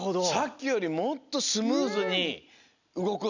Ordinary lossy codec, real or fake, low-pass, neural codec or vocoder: none; real; 7.2 kHz; none